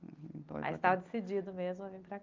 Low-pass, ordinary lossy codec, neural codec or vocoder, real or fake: 7.2 kHz; Opus, 24 kbps; none; real